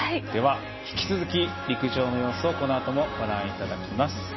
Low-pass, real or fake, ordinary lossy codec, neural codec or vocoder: 7.2 kHz; real; MP3, 24 kbps; none